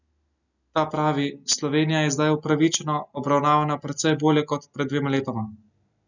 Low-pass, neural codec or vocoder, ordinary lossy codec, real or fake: 7.2 kHz; none; none; real